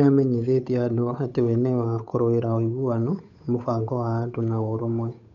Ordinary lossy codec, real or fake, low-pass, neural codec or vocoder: none; fake; 7.2 kHz; codec, 16 kHz, 8 kbps, FunCodec, trained on Chinese and English, 25 frames a second